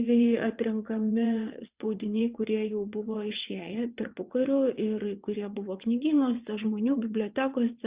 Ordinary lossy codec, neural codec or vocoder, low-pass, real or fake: Opus, 64 kbps; vocoder, 22.05 kHz, 80 mel bands, WaveNeXt; 3.6 kHz; fake